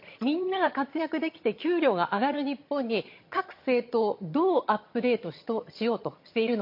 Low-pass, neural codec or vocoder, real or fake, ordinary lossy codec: 5.4 kHz; vocoder, 22.05 kHz, 80 mel bands, HiFi-GAN; fake; MP3, 32 kbps